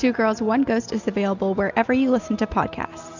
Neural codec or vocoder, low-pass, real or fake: none; 7.2 kHz; real